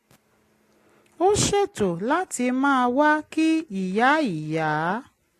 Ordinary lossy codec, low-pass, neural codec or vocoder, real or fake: AAC, 48 kbps; 14.4 kHz; none; real